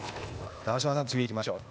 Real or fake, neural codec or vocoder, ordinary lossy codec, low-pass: fake; codec, 16 kHz, 0.8 kbps, ZipCodec; none; none